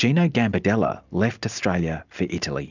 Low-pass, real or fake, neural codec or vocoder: 7.2 kHz; real; none